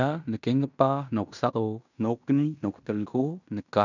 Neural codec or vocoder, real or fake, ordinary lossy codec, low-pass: codec, 16 kHz in and 24 kHz out, 0.9 kbps, LongCat-Audio-Codec, fine tuned four codebook decoder; fake; none; 7.2 kHz